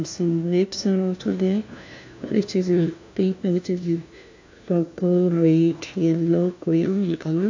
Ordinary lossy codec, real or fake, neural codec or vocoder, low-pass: AAC, 48 kbps; fake; codec, 16 kHz, 1 kbps, FunCodec, trained on LibriTTS, 50 frames a second; 7.2 kHz